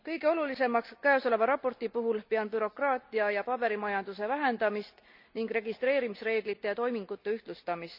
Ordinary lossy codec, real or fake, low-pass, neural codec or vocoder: none; real; 5.4 kHz; none